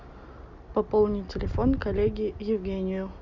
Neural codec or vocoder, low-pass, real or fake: none; 7.2 kHz; real